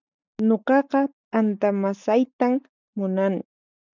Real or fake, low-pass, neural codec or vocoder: real; 7.2 kHz; none